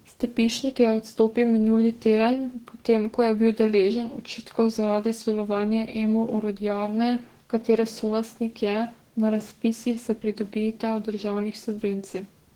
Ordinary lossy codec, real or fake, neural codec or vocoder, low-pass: Opus, 16 kbps; fake; codec, 44.1 kHz, 2.6 kbps, DAC; 19.8 kHz